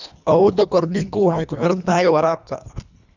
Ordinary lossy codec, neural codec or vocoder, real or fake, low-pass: none; codec, 24 kHz, 1.5 kbps, HILCodec; fake; 7.2 kHz